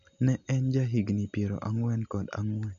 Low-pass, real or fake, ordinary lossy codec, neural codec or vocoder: 7.2 kHz; real; none; none